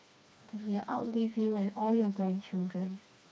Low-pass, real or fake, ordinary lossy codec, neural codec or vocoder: none; fake; none; codec, 16 kHz, 2 kbps, FreqCodec, smaller model